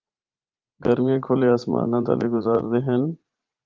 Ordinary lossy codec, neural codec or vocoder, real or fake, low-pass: Opus, 32 kbps; vocoder, 44.1 kHz, 80 mel bands, Vocos; fake; 7.2 kHz